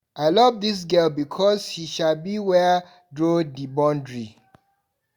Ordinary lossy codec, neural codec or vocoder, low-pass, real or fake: Opus, 64 kbps; none; 19.8 kHz; real